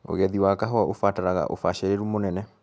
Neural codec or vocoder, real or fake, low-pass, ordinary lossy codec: none; real; none; none